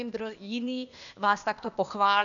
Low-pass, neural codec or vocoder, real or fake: 7.2 kHz; codec, 16 kHz, 0.8 kbps, ZipCodec; fake